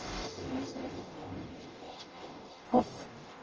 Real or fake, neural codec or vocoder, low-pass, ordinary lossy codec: fake; codec, 44.1 kHz, 0.9 kbps, DAC; 7.2 kHz; Opus, 24 kbps